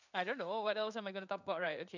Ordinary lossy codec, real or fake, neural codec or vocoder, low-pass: none; fake; codec, 16 kHz in and 24 kHz out, 1 kbps, XY-Tokenizer; 7.2 kHz